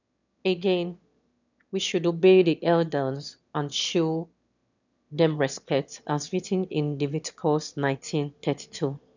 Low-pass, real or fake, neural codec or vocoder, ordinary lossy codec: 7.2 kHz; fake; autoencoder, 22.05 kHz, a latent of 192 numbers a frame, VITS, trained on one speaker; none